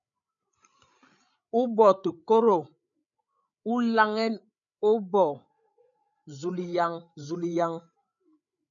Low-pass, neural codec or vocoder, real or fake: 7.2 kHz; codec, 16 kHz, 8 kbps, FreqCodec, larger model; fake